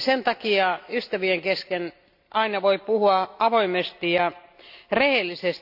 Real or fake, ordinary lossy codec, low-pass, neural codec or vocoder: real; AAC, 48 kbps; 5.4 kHz; none